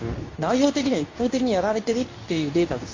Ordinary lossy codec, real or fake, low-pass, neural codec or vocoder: AAC, 32 kbps; fake; 7.2 kHz; codec, 24 kHz, 0.9 kbps, WavTokenizer, medium speech release version 2